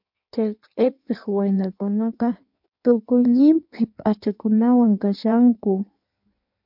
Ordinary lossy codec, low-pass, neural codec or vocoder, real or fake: MP3, 48 kbps; 5.4 kHz; codec, 16 kHz in and 24 kHz out, 1.1 kbps, FireRedTTS-2 codec; fake